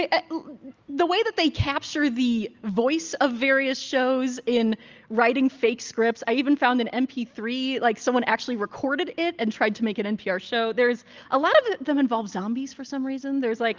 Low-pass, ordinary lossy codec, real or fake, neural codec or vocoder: 7.2 kHz; Opus, 32 kbps; real; none